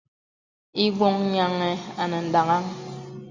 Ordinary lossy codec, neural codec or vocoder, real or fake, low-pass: Opus, 64 kbps; none; real; 7.2 kHz